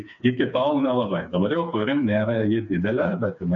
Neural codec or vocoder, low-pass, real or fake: codec, 16 kHz, 4 kbps, FreqCodec, smaller model; 7.2 kHz; fake